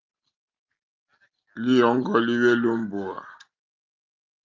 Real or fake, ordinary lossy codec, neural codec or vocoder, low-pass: real; Opus, 24 kbps; none; 7.2 kHz